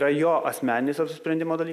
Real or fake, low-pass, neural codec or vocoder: real; 14.4 kHz; none